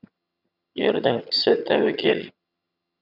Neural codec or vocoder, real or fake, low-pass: vocoder, 22.05 kHz, 80 mel bands, HiFi-GAN; fake; 5.4 kHz